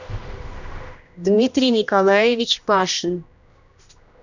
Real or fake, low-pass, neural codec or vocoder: fake; 7.2 kHz; codec, 16 kHz, 1 kbps, X-Codec, HuBERT features, trained on general audio